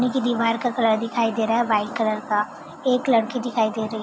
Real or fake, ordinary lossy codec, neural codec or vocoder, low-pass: real; none; none; none